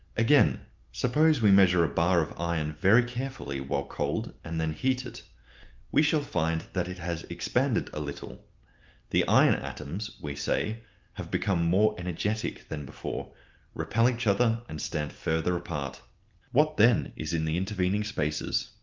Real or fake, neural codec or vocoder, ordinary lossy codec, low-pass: real; none; Opus, 24 kbps; 7.2 kHz